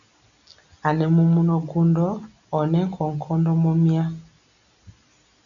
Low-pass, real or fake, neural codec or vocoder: 7.2 kHz; real; none